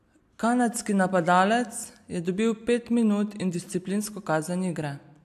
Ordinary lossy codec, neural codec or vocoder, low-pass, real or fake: AAC, 96 kbps; none; 14.4 kHz; real